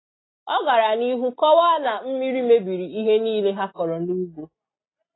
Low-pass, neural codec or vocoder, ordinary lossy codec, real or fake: 7.2 kHz; none; AAC, 16 kbps; real